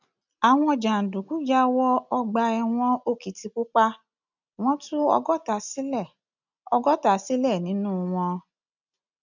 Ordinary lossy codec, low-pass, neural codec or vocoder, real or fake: none; 7.2 kHz; none; real